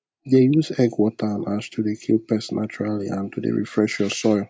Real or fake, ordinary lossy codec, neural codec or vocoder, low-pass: real; none; none; none